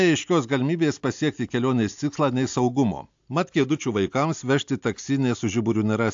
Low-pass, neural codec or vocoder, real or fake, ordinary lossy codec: 7.2 kHz; none; real; AAC, 64 kbps